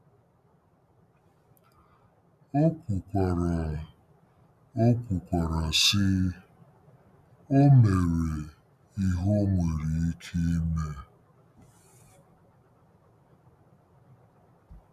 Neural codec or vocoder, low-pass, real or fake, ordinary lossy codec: none; 14.4 kHz; real; none